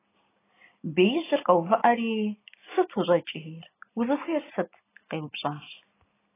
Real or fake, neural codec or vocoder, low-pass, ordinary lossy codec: real; none; 3.6 kHz; AAC, 16 kbps